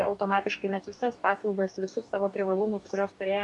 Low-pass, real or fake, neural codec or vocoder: 10.8 kHz; fake; codec, 44.1 kHz, 2.6 kbps, DAC